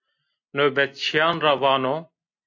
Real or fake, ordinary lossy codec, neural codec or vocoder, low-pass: real; AAC, 48 kbps; none; 7.2 kHz